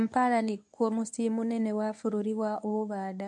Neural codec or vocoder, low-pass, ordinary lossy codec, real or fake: codec, 24 kHz, 0.9 kbps, WavTokenizer, medium speech release version 1; none; none; fake